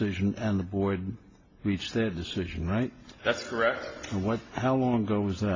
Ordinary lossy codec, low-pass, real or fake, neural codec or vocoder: AAC, 32 kbps; 7.2 kHz; real; none